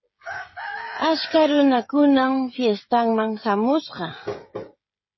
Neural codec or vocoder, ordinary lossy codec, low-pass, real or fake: codec, 16 kHz, 16 kbps, FreqCodec, smaller model; MP3, 24 kbps; 7.2 kHz; fake